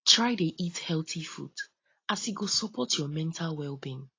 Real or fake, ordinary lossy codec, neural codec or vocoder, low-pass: real; AAC, 32 kbps; none; 7.2 kHz